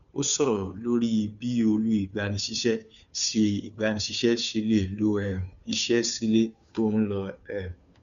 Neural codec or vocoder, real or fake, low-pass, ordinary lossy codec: codec, 16 kHz, 2 kbps, FunCodec, trained on Chinese and English, 25 frames a second; fake; 7.2 kHz; none